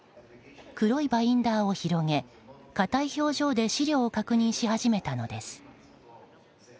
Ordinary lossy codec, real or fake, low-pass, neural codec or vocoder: none; real; none; none